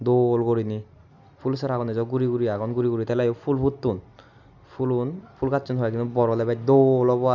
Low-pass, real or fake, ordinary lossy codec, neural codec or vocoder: 7.2 kHz; real; none; none